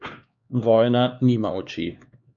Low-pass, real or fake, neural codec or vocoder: 7.2 kHz; fake; codec, 16 kHz, 4 kbps, X-Codec, HuBERT features, trained on LibriSpeech